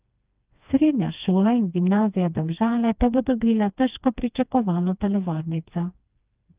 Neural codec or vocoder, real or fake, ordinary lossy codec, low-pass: codec, 16 kHz, 2 kbps, FreqCodec, smaller model; fake; Opus, 24 kbps; 3.6 kHz